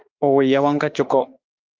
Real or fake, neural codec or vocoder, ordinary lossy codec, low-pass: fake; autoencoder, 48 kHz, 32 numbers a frame, DAC-VAE, trained on Japanese speech; Opus, 24 kbps; 7.2 kHz